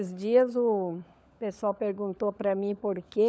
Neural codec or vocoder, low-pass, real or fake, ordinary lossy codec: codec, 16 kHz, 16 kbps, FunCodec, trained on LibriTTS, 50 frames a second; none; fake; none